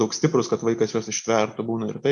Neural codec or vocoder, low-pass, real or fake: none; 10.8 kHz; real